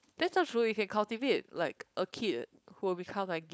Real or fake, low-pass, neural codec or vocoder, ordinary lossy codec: fake; none; codec, 16 kHz, 4.8 kbps, FACodec; none